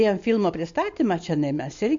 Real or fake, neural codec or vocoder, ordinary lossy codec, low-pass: real; none; AAC, 64 kbps; 7.2 kHz